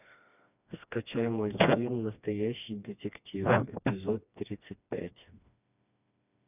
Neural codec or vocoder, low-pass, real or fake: codec, 16 kHz, 2 kbps, FreqCodec, smaller model; 3.6 kHz; fake